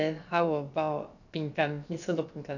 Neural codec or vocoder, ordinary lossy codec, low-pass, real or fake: codec, 16 kHz, about 1 kbps, DyCAST, with the encoder's durations; none; 7.2 kHz; fake